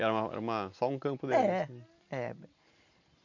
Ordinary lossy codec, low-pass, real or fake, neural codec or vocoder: MP3, 48 kbps; 7.2 kHz; real; none